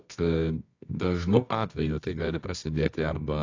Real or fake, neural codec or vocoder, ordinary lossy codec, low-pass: fake; codec, 24 kHz, 0.9 kbps, WavTokenizer, medium music audio release; AAC, 48 kbps; 7.2 kHz